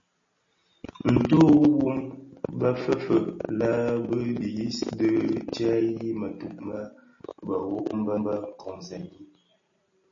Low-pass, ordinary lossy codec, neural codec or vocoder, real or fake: 7.2 kHz; MP3, 32 kbps; none; real